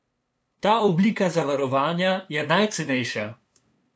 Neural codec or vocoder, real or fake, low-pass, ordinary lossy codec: codec, 16 kHz, 2 kbps, FunCodec, trained on LibriTTS, 25 frames a second; fake; none; none